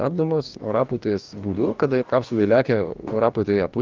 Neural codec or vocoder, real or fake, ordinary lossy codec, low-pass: codec, 44.1 kHz, 2.6 kbps, DAC; fake; Opus, 24 kbps; 7.2 kHz